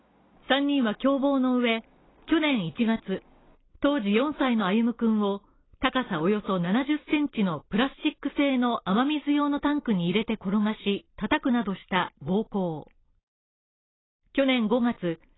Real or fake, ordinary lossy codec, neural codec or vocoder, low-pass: real; AAC, 16 kbps; none; 7.2 kHz